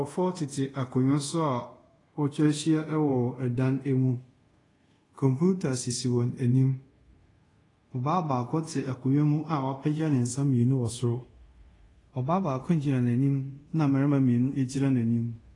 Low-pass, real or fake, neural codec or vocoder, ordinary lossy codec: 10.8 kHz; fake; codec, 24 kHz, 0.5 kbps, DualCodec; AAC, 32 kbps